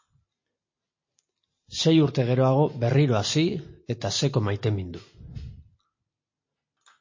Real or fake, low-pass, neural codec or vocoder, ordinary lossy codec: real; 7.2 kHz; none; MP3, 32 kbps